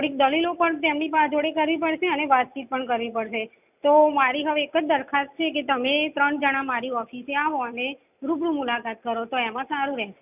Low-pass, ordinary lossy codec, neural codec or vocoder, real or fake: 3.6 kHz; none; none; real